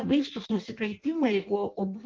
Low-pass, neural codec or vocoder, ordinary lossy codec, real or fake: 7.2 kHz; codec, 16 kHz in and 24 kHz out, 0.6 kbps, FireRedTTS-2 codec; Opus, 24 kbps; fake